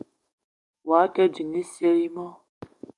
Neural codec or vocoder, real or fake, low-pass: codec, 44.1 kHz, 7.8 kbps, DAC; fake; 10.8 kHz